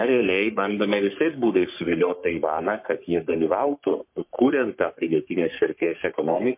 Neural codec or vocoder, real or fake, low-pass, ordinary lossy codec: codec, 44.1 kHz, 3.4 kbps, Pupu-Codec; fake; 3.6 kHz; MP3, 32 kbps